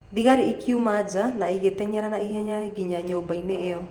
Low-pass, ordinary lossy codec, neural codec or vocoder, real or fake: 19.8 kHz; Opus, 32 kbps; vocoder, 48 kHz, 128 mel bands, Vocos; fake